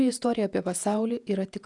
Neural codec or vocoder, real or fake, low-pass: vocoder, 48 kHz, 128 mel bands, Vocos; fake; 10.8 kHz